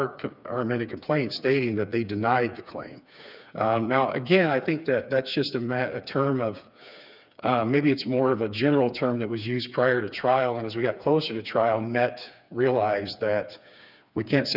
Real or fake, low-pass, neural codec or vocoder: fake; 5.4 kHz; codec, 16 kHz, 4 kbps, FreqCodec, smaller model